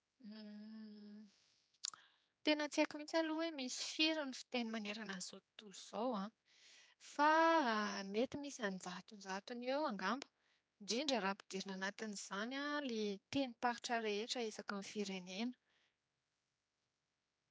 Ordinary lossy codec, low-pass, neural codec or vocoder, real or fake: none; none; codec, 16 kHz, 4 kbps, X-Codec, HuBERT features, trained on general audio; fake